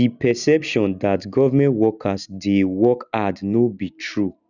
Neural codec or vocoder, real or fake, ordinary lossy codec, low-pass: none; real; none; 7.2 kHz